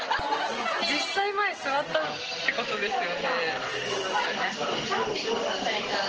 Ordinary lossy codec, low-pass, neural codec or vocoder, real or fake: Opus, 16 kbps; 7.2 kHz; none; real